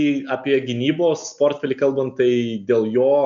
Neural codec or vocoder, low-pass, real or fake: none; 7.2 kHz; real